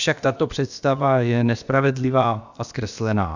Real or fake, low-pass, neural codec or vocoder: fake; 7.2 kHz; codec, 16 kHz, about 1 kbps, DyCAST, with the encoder's durations